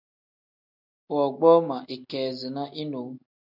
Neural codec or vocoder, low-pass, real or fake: none; 5.4 kHz; real